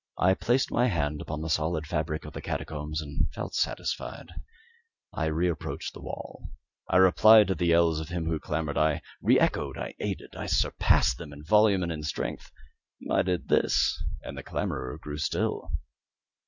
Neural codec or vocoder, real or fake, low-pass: none; real; 7.2 kHz